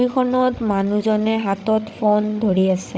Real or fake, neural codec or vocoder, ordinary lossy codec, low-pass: fake; codec, 16 kHz, 16 kbps, FreqCodec, larger model; none; none